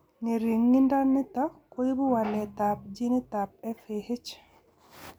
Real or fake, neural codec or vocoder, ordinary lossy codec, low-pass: real; none; none; none